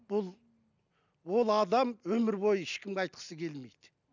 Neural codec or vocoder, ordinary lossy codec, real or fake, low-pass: none; none; real; 7.2 kHz